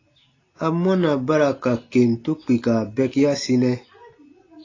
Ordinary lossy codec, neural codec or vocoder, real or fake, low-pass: AAC, 32 kbps; none; real; 7.2 kHz